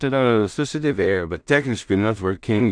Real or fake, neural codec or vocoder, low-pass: fake; codec, 16 kHz in and 24 kHz out, 0.4 kbps, LongCat-Audio-Codec, two codebook decoder; 9.9 kHz